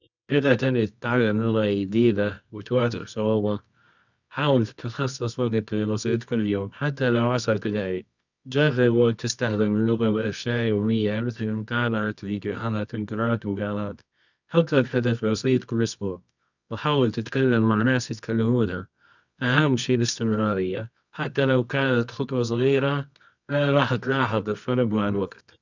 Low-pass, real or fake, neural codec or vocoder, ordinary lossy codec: 7.2 kHz; fake; codec, 24 kHz, 0.9 kbps, WavTokenizer, medium music audio release; none